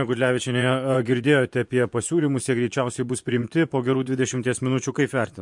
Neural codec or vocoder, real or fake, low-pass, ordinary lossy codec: vocoder, 24 kHz, 100 mel bands, Vocos; fake; 10.8 kHz; MP3, 64 kbps